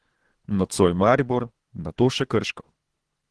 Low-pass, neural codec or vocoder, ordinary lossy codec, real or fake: 10.8 kHz; codec, 24 kHz, 3 kbps, HILCodec; Opus, 16 kbps; fake